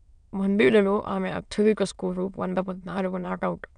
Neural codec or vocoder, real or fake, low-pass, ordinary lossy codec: autoencoder, 22.05 kHz, a latent of 192 numbers a frame, VITS, trained on many speakers; fake; 9.9 kHz; none